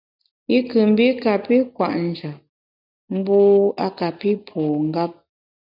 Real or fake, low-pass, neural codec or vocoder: real; 5.4 kHz; none